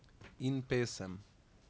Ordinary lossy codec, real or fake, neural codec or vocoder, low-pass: none; real; none; none